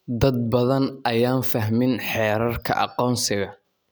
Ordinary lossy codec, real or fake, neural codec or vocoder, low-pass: none; real; none; none